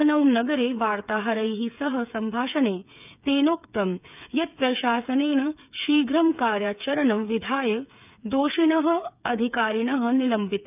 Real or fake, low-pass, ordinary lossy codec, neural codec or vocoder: fake; 3.6 kHz; none; codec, 16 kHz, 8 kbps, FreqCodec, smaller model